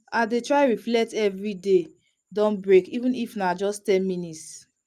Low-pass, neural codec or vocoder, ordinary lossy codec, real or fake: 14.4 kHz; none; Opus, 32 kbps; real